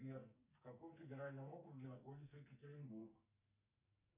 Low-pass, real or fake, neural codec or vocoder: 3.6 kHz; fake; codec, 44.1 kHz, 2.6 kbps, SNAC